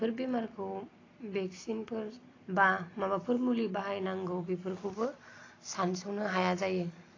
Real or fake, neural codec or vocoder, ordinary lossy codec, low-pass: real; none; AAC, 32 kbps; 7.2 kHz